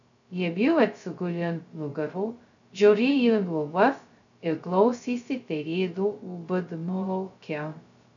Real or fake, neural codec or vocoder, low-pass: fake; codec, 16 kHz, 0.2 kbps, FocalCodec; 7.2 kHz